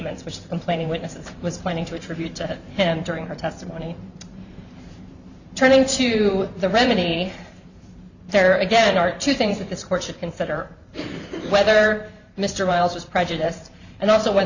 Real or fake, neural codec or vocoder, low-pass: fake; vocoder, 44.1 kHz, 128 mel bands every 512 samples, BigVGAN v2; 7.2 kHz